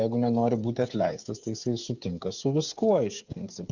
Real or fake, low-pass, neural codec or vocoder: fake; 7.2 kHz; codec, 16 kHz, 8 kbps, FreqCodec, smaller model